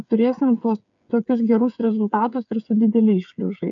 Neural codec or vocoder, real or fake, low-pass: codec, 16 kHz, 8 kbps, FreqCodec, smaller model; fake; 7.2 kHz